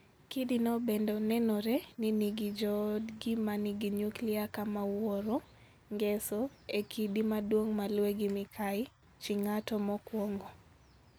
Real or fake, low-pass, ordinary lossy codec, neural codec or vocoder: real; none; none; none